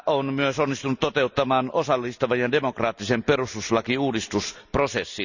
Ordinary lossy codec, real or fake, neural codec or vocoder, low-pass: none; real; none; 7.2 kHz